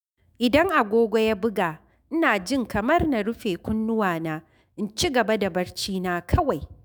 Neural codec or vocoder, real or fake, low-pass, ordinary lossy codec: autoencoder, 48 kHz, 128 numbers a frame, DAC-VAE, trained on Japanese speech; fake; none; none